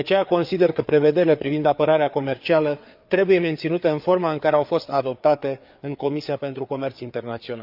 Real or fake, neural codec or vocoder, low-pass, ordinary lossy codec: fake; codec, 16 kHz, 4 kbps, FreqCodec, larger model; 5.4 kHz; none